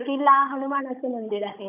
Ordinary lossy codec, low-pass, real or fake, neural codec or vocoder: none; 3.6 kHz; fake; codec, 16 kHz, 16 kbps, FunCodec, trained on Chinese and English, 50 frames a second